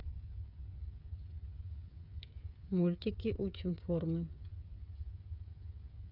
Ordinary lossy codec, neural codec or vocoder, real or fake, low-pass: none; codec, 16 kHz, 8 kbps, FreqCodec, smaller model; fake; 5.4 kHz